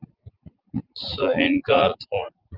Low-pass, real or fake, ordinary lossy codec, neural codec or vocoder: 5.4 kHz; fake; Opus, 24 kbps; vocoder, 44.1 kHz, 80 mel bands, Vocos